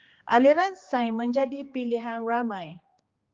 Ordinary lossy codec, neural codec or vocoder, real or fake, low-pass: Opus, 32 kbps; codec, 16 kHz, 2 kbps, X-Codec, HuBERT features, trained on general audio; fake; 7.2 kHz